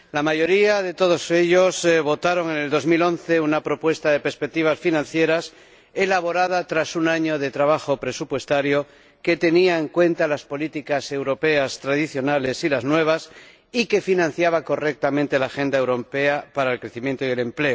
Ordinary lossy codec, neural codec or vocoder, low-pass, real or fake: none; none; none; real